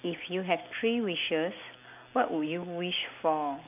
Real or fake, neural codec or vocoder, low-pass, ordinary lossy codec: real; none; 3.6 kHz; none